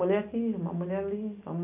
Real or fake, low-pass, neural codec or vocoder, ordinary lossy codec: real; 3.6 kHz; none; none